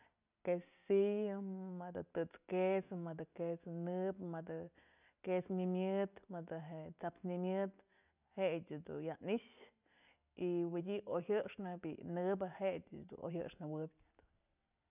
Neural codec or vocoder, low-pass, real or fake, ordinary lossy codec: none; 3.6 kHz; real; none